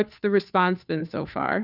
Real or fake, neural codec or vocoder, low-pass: fake; codec, 16 kHz, 2 kbps, FunCodec, trained on Chinese and English, 25 frames a second; 5.4 kHz